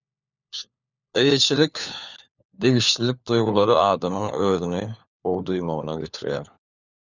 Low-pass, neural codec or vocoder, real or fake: 7.2 kHz; codec, 16 kHz, 4 kbps, FunCodec, trained on LibriTTS, 50 frames a second; fake